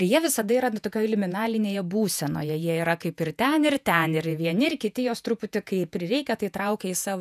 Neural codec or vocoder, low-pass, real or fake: vocoder, 48 kHz, 128 mel bands, Vocos; 14.4 kHz; fake